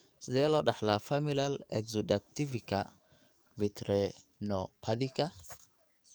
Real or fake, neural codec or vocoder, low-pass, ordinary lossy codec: fake; codec, 44.1 kHz, 7.8 kbps, DAC; none; none